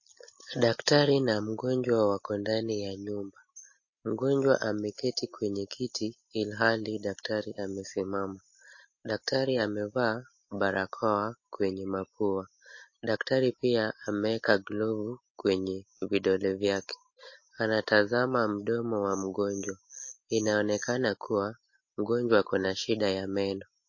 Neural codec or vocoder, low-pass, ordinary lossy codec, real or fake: none; 7.2 kHz; MP3, 32 kbps; real